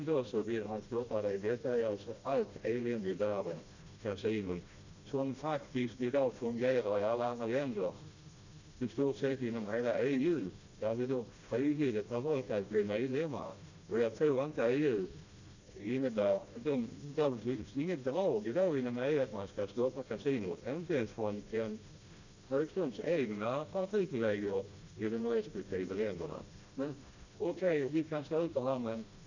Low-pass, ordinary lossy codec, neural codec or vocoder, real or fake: 7.2 kHz; Opus, 64 kbps; codec, 16 kHz, 1 kbps, FreqCodec, smaller model; fake